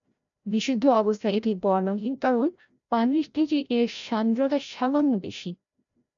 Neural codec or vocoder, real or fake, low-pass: codec, 16 kHz, 0.5 kbps, FreqCodec, larger model; fake; 7.2 kHz